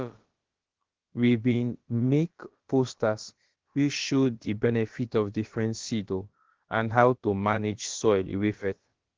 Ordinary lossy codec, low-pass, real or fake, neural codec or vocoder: Opus, 16 kbps; 7.2 kHz; fake; codec, 16 kHz, about 1 kbps, DyCAST, with the encoder's durations